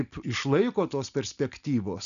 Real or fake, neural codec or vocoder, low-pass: real; none; 7.2 kHz